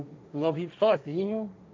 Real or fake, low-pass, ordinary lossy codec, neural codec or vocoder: fake; none; none; codec, 16 kHz, 1.1 kbps, Voila-Tokenizer